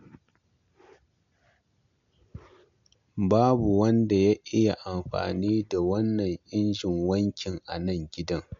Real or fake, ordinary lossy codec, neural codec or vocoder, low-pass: real; MP3, 64 kbps; none; 7.2 kHz